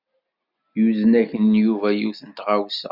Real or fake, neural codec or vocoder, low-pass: real; none; 5.4 kHz